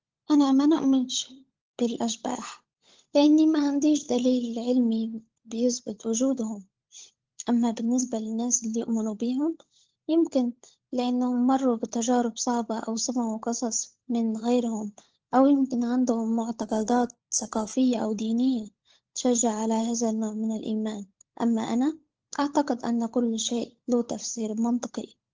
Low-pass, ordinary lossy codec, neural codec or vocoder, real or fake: 7.2 kHz; Opus, 16 kbps; codec, 16 kHz, 16 kbps, FunCodec, trained on LibriTTS, 50 frames a second; fake